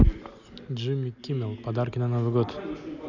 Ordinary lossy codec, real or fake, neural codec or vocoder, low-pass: none; real; none; 7.2 kHz